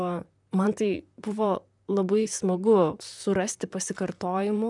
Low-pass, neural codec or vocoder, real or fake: 10.8 kHz; vocoder, 44.1 kHz, 128 mel bands, Pupu-Vocoder; fake